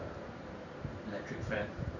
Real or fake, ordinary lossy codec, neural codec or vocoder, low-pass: fake; none; vocoder, 44.1 kHz, 128 mel bands every 512 samples, BigVGAN v2; 7.2 kHz